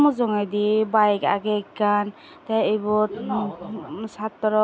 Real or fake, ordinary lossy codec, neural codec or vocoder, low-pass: real; none; none; none